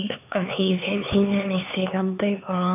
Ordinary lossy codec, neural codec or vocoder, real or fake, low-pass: none; codec, 16 kHz, 4 kbps, X-Codec, HuBERT features, trained on LibriSpeech; fake; 3.6 kHz